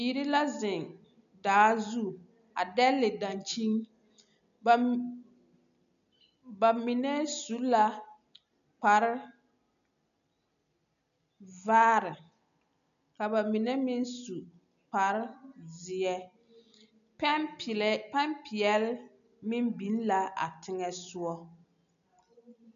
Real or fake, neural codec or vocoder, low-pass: real; none; 7.2 kHz